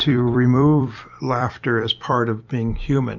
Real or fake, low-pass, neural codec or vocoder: fake; 7.2 kHz; vocoder, 44.1 kHz, 128 mel bands every 256 samples, BigVGAN v2